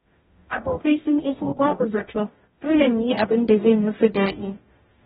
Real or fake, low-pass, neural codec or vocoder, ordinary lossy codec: fake; 19.8 kHz; codec, 44.1 kHz, 0.9 kbps, DAC; AAC, 16 kbps